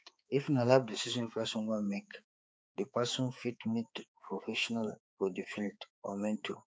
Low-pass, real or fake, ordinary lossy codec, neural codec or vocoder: none; fake; none; codec, 16 kHz, 4 kbps, X-Codec, HuBERT features, trained on balanced general audio